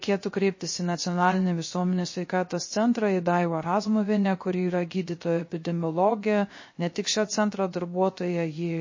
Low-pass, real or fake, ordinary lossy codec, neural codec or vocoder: 7.2 kHz; fake; MP3, 32 kbps; codec, 16 kHz, 0.3 kbps, FocalCodec